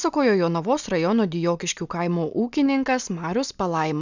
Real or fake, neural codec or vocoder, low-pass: real; none; 7.2 kHz